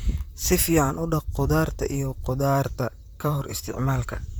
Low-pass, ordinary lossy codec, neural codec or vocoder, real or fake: none; none; vocoder, 44.1 kHz, 128 mel bands, Pupu-Vocoder; fake